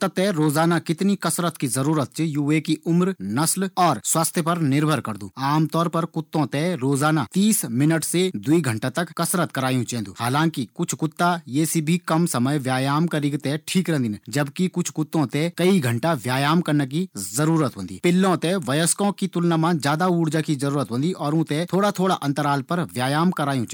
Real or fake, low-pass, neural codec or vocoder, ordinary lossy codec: real; none; none; none